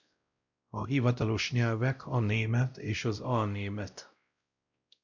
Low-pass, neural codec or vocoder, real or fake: 7.2 kHz; codec, 16 kHz, 0.5 kbps, X-Codec, WavLM features, trained on Multilingual LibriSpeech; fake